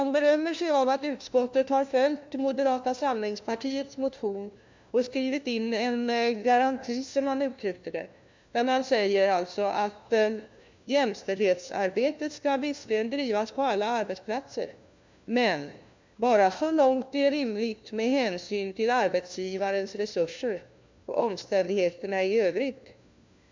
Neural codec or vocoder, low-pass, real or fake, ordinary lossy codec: codec, 16 kHz, 1 kbps, FunCodec, trained on LibriTTS, 50 frames a second; 7.2 kHz; fake; MP3, 64 kbps